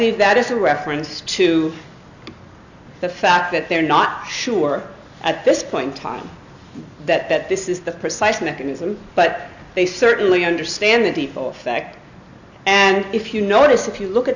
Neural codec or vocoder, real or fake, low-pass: none; real; 7.2 kHz